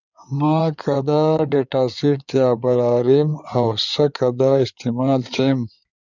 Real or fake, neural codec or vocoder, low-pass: fake; codec, 16 kHz in and 24 kHz out, 2.2 kbps, FireRedTTS-2 codec; 7.2 kHz